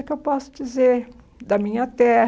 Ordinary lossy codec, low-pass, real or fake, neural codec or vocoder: none; none; real; none